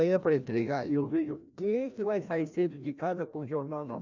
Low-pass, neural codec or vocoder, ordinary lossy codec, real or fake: 7.2 kHz; codec, 16 kHz, 1 kbps, FreqCodec, larger model; none; fake